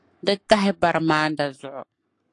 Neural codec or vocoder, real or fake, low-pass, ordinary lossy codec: codec, 44.1 kHz, 7.8 kbps, Pupu-Codec; fake; 10.8 kHz; AAC, 48 kbps